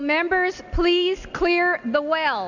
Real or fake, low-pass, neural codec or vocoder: real; 7.2 kHz; none